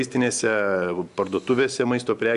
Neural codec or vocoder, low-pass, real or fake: none; 10.8 kHz; real